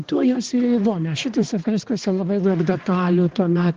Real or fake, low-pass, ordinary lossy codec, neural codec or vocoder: fake; 7.2 kHz; Opus, 16 kbps; codec, 16 kHz, 2 kbps, X-Codec, HuBERT features, trained on balanced general audio